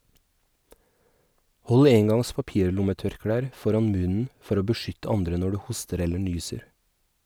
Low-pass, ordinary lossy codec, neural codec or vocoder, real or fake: none; none; none; real